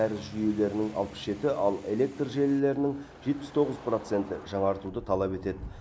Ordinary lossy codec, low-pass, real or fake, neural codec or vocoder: none; none; real; none